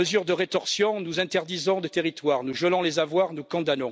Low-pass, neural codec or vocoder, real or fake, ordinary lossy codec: none; none; real; none